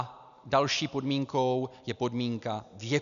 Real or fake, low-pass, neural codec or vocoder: real; 7.2 kHz; none